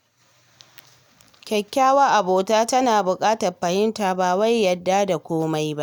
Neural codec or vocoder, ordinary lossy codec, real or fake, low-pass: none; none; real; none